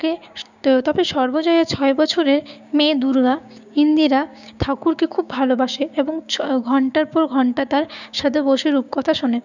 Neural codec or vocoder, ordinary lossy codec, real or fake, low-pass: codec, 16 kHz, 6 kbps, DAC; none; fake; 7.2 kHz